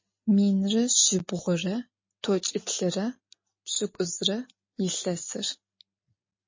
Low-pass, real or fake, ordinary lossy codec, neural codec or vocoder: 7.2 kHz; real; MP3, 32 kbps; none